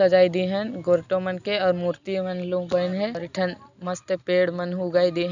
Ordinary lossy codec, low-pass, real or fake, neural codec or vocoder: none; 7.2 kHz; real; none